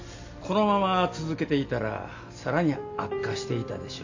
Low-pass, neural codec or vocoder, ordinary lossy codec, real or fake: 7.2 kHz; none; AAC, 48 kbps; real